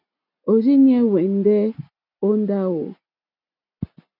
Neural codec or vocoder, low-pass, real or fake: none; 5.4 kHz; real